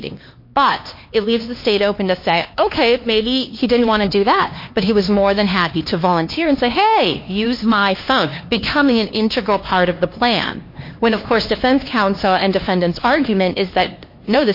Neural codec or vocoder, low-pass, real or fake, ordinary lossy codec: codec, 16 kHz, 2 kbps, X-Codec, HuBERT features, trained on LibriSpeech; 5.4 kHz; fake; MP3, 32 kbps